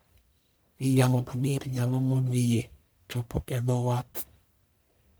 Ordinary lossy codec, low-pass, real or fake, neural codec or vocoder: none; none; fake; codec, 44.1 kHz, 1.7 kbps, Pupu-Codec